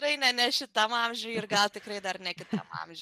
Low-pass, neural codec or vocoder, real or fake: 14.4 kHz; none; real